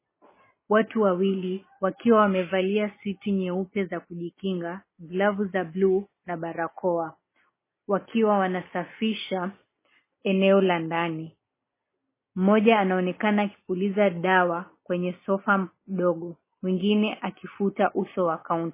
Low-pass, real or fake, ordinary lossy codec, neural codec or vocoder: 3.6 kHz; real; MP3, 16 kbps; none